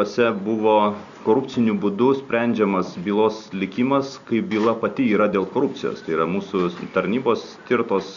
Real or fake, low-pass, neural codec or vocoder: real; 7.2 kHz; none